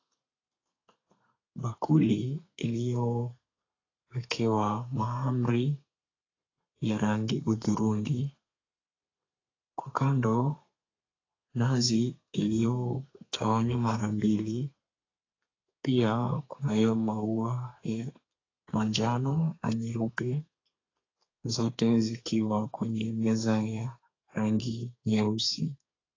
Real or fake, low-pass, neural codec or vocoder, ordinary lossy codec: fake; 7.2 kHz; codec, 32 kHz, 1.9 kbps, SNAC; AAC, 32 kbps